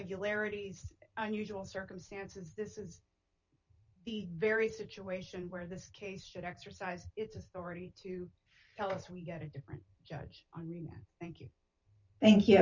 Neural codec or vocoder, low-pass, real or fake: vocoder, 44.1 kHz, 128 mel bands every 512 samples, BigVGAN v2; 7.2 kHz; fake